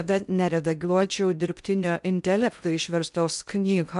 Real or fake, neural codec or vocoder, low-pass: fake; codec, 16 kHz in and 24 kHz out, 0.6 kbps, FocalCodec, streaming, 2048 codes; 10.8 kHz